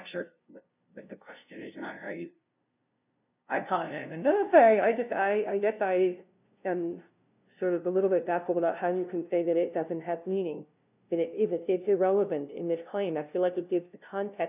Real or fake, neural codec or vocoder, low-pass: fake; codec, 16 kHz, 0.5 kbps, FunCodec, trained on LibriTTS, 25 frames a second; 3.6 kHz